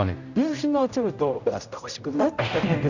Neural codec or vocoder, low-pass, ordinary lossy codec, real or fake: codec, 16 kHz, 0.5 kbps, X-Codec, HuBERT features, trained on general audio; 7.2 kHz; none; fake